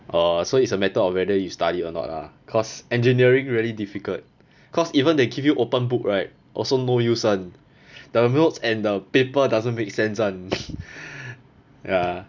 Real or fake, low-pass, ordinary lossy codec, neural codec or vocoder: real; 7.2 kHz; none; none